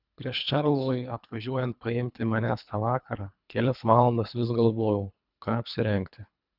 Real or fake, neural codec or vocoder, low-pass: fake; codec, 24 kHz, 3 kbps, HILCodec; 5.4 kHz